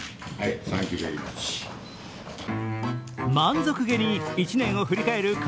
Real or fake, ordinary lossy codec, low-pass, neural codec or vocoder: real; none; none; none